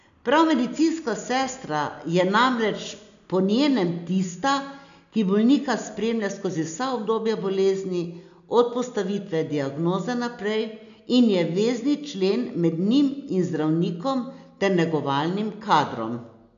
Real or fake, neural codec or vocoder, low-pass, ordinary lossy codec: real; none; 7.2 kHz; none